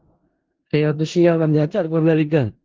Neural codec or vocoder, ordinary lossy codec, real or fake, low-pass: codec, 16 kHz in and 24 kHz out, 0.4 kbps, LongCat-Audio-Codec, four codebook decoder; Opus, 16 kbps; fake; 7.2 kHz